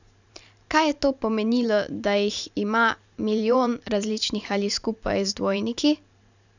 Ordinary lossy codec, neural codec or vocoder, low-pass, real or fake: none; vocoder, 44.1 kHz, 128 mel bands every 512 samples, BigVGAN v2; 7.2 kHz; fake